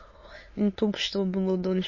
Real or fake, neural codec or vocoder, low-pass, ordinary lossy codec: fake; autoencoder, 22.05 kHz, a latent of 192 numbers a frame, VITS, trained on many speakers; 7.2 kHz; MP3, 32 kbps